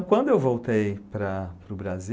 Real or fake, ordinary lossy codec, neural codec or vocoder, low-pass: real; none; none; none